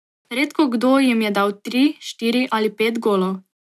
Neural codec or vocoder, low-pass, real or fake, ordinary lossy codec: none; 14.4 kHz; real; none